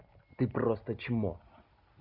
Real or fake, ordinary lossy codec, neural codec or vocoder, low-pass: real; none; none; 5.4 kHz